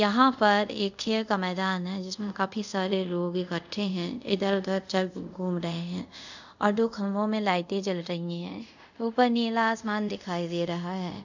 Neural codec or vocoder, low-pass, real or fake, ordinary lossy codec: codec, 24 kHz, 0.5 kbps, DualCodec; 7.2 kHz; fake; none